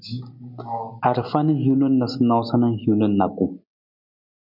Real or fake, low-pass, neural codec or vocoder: real; 5.4 kHz; none